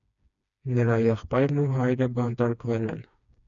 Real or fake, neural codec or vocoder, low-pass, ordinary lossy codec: fake; codec, 16 kHz, 2 kbps, FreqCodec, smaller model; 7.2 kHz; MP3, 96 kbps